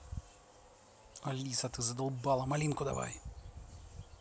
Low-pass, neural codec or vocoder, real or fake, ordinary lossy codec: none; none; real; none